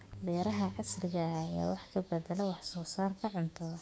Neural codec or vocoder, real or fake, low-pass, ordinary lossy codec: codec, 16 kHz, 6 kbps, DAC; fake; none; none